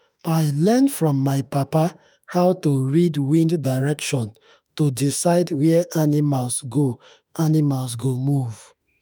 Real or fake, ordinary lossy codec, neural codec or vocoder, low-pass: fake; none; autoencoder, 48 kHz, 32 numbers a frame, DAC-VAE, trained on Japanese speech; none